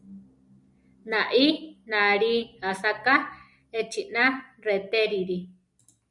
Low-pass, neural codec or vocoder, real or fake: 10.8 kHz; none; real